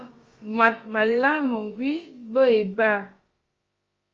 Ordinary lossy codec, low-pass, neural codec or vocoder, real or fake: Opus, 32 kbps; 7.2 kHz; codec, 16 kHz, about 1 kbps, DyCAST, with the encoder's durations; fake